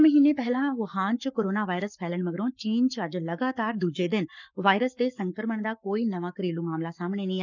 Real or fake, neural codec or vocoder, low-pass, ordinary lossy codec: fake; codec, 44.1 kHz, 7.8 kbps, Pupu-Codec; 7.2 kHz; none